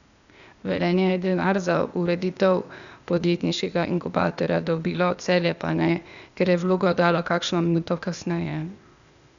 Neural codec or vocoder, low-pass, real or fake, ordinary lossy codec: codec, 16 kHz, 0.8 kbps, ZipCodec; 7.2 kHz; fake; none